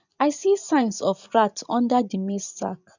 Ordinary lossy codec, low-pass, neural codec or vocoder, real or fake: none; 7.2 kHz; vocoder, 24 kHz, 100 mel bands, Vocos; fake